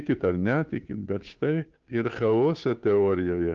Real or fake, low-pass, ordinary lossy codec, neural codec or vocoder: fake; 7.2 kHz; Opus, 24 kbps; codec, 16 kHz, 2 kbps, FunCodec, trained on Chinese and English, 25 frames a second